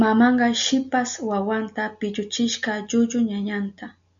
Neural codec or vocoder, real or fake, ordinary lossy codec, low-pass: none; real; MP3, 64 kbps; 7.2 kHz